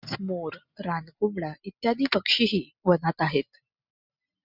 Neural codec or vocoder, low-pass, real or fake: none; 5.4 kHz; real